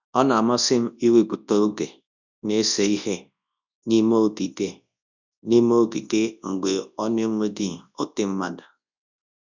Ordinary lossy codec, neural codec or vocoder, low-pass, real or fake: none; codec, 24 kHz, 0.9 kbps, WavTokenizer, large speech release; 7.2 kHz; fake